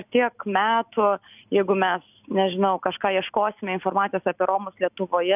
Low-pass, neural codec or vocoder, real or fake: 3.6 kHz; none; real